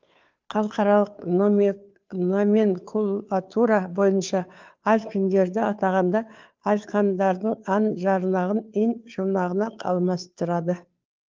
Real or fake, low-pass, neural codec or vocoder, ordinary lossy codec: fake; 7.2 kHz; codec, 16 kHz, 2 kbps, FunCodec, trained on Chinese and English, 25 frames a second; Opus, 32 kbps